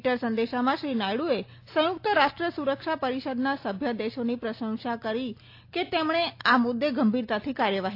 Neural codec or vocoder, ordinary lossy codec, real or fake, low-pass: none; AAC, 32 kbps; real; 5.4 kHz